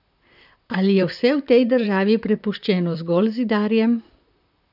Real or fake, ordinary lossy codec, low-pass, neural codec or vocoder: fake; none; 5.4 kHz; vocoder, 44.1 kHz, 128 mel bands, Pupu-Vocoder